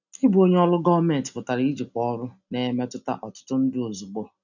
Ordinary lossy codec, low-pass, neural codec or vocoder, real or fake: none; 7.2 kHz; none; real